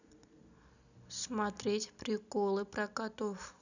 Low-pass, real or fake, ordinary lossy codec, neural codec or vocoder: 7.2 kHz; real; none; none